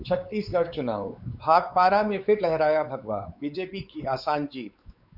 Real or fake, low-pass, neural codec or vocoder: fake; 5.4 kHz; codec, 16 kHz, 4 kbps, X-Codec, WavLM features, trained on Multilingual LibriSpeech